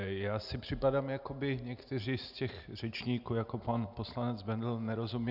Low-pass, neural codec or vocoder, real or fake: 5.4 kHz; none; real